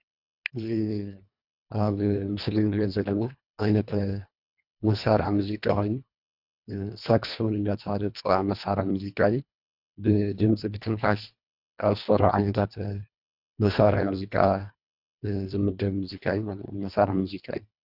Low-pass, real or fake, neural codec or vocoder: 5.4 kHz; fake; codec, 24 kHz, 1.5 kbps, HILCodec